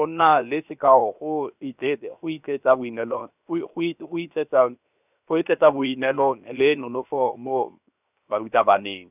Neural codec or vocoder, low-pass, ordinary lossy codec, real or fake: codec, 16 kHz, 0.7 kbps, FocalCodec; 3.6 kHz; none; fake